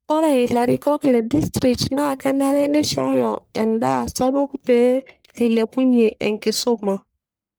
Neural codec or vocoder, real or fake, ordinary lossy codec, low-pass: codec, 44.1 kHz, 1.7 kbps, Pupu-Codec; fake; none; none